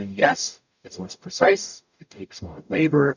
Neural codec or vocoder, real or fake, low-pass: codec, 44.1 kHz, 0.9 kbps, DAC; fake; 7.2 kHz